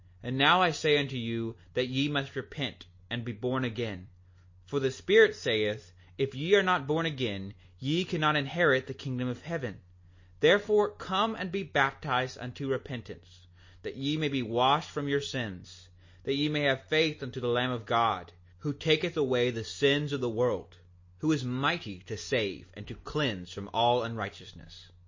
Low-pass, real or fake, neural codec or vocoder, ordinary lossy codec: 7.2 kHz; real; none; MP3, 32 kbps